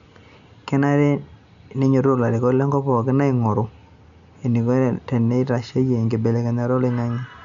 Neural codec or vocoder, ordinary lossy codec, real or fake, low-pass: none; none; real; 7.2 kHz